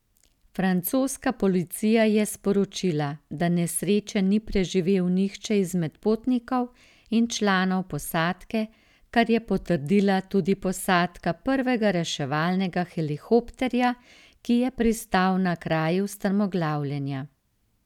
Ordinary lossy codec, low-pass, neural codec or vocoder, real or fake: none; 19.8 kHz; none; real